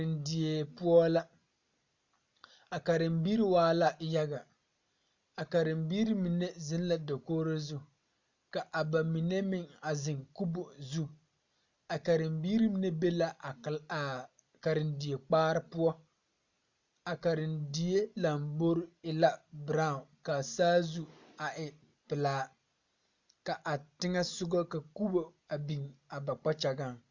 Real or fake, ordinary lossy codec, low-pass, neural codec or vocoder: real; Opus, 64 kbps; 7.2 kHz; none